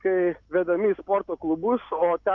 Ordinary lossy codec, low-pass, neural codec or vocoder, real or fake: MP3, 64 kbps; 7.2 kHz; none; real